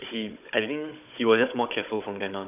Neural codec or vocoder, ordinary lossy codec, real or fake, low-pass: codec, 16 kHz, 16 kbps, FunCodec, trained on Chinese and English, 50 frames a second; none; fake; 3.6 kHz